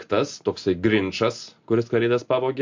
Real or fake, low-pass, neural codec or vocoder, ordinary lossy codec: real; 7.2 kHz; none; MP3, 48 kbps